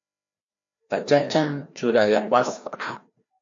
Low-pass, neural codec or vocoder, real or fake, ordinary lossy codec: 7.2 kHz; codec, 16 kHz, 1 kbps, FreqCodec, larger model; fake; MP3, 48 kbps